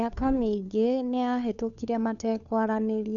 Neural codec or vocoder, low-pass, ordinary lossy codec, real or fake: codec, 16 kHz, 2 kbps, FunCodec, trained on Chinese and English, 25 frames a second; 7.2 kHz; none; fake